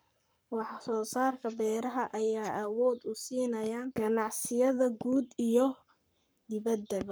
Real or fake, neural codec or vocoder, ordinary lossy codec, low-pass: fake; codec, 44.1 kHz, 7.8 kbps, Pupu-Codec; none; none